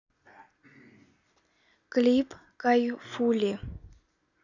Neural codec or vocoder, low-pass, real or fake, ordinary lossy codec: none; 7.2 kHz; real; none